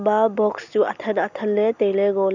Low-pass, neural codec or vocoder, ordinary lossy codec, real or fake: 7.2 kHz; none; none; real